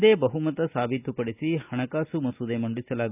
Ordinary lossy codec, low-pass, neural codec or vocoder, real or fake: none; 3.6 kHz; none; real